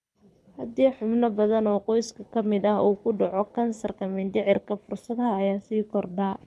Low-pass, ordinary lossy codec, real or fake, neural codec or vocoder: none; none; fake; codec, 24 kHz, 6 kbps, HILCodec